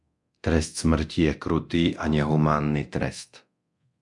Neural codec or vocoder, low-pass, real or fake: codec, 24 kHz, 0.9 kbps, DualCodec; 10.8 kHz; fake